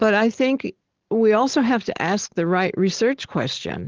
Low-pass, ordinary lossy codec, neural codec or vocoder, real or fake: 7.2 kHz; Opus, 16 kbps; none; real